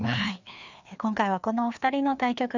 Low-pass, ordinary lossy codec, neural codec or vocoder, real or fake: 7.2 kHz; none; codec, 16 kHz, 2 kbps, FreqCodec, larger model; fake